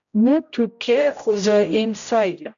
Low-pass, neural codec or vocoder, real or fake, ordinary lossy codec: 7.2 kHz; codec, 16 kHz, 0.5 kbps, X-Codec, HuBERT features, trained on general audio; fake; MP3, 96 kbps